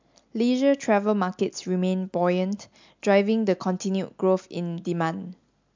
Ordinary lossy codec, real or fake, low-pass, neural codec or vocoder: MP3, 64 kbps; real; 7.2 kHz; none